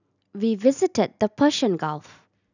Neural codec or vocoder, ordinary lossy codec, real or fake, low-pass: none; none; real; 7.2 kHz